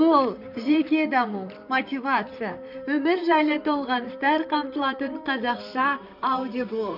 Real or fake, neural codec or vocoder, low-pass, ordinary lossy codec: fake; vocoder, 44.1 kHz, 128 mel bands, Pupu-Vocoder; 5.4 kHz; none